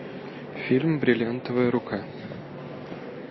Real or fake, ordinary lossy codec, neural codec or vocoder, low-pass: real; MP3, 24 kbps; none; 7.2 kHz